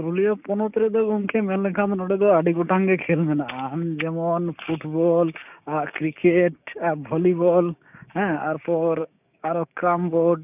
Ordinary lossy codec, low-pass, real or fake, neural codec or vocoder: none; 3.6 kHz; fake; codec, 16 kHz, 6 kbps, DAC